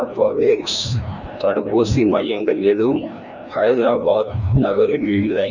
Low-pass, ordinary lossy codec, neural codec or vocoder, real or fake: 7.2 kHz; none; codec, 16 kHz, 1 kbps, FreqCodec, larger model; fake